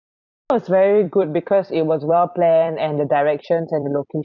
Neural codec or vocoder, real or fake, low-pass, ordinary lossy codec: vocoder, 44.1 kHz, 128 mel bands every 256 samples, BigVGAN v2; fake; 7.2 kHz; none